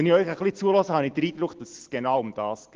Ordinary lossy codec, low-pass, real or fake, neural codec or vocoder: Opus, 16 kbps; 7.2 kHz; real; none